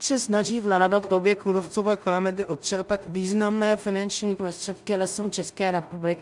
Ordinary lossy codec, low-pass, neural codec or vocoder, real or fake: MP3, 96 kbps; 10.8 kHz; codec, 16 kHz in and 24 kHz out, 0.4 kbps, LongCat-Audio-Codec, two codebook decoder; fake